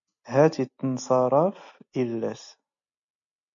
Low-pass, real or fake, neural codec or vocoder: 7.2 kHz; real; none